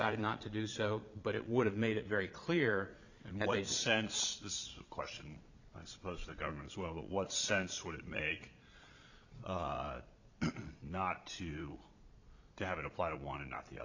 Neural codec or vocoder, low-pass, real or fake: vocoder, 22.05 kHz, 80 mel bands, Vocos; 7.2 kHz; fake